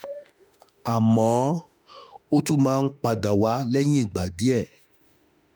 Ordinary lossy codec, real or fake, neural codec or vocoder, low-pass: none; fake; autoencoder, 48 kHz, 32 numbers a frame, DAC-VAE, trained on Japanese speech; none